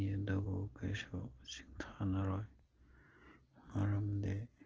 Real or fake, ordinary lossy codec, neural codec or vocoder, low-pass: real; Opus, 16 kbps; none; 7.2 kHz